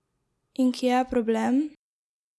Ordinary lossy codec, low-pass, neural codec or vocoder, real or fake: none; none; none; real